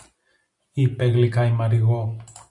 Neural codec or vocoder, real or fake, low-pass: none; real; 10.8 kHz